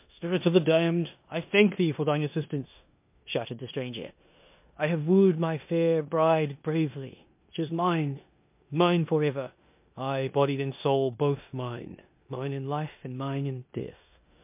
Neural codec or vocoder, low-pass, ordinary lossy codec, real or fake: codec, 16 kHz in and 24 kHz out, 0.9 kbps, LongCat-Audio-Codec, four codebook decoder; 3.6 kHz; MP3, 32 kbps; fake